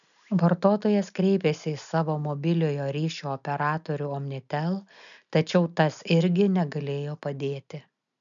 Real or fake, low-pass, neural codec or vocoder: real; 7.2 kHz; none